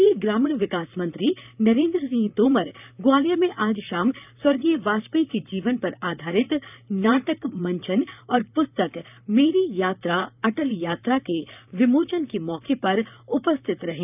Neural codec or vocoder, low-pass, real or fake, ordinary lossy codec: vocoder, 44.1 kHz, 128 mel bands, Pupu-Vocoder; 3.6 kHz; fake; none